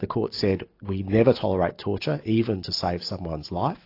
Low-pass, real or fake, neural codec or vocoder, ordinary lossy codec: 5.4 kHz; real; none; AAC, 32 kbps